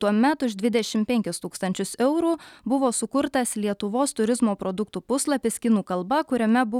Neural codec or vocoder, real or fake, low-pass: none; real; 19.8 kHz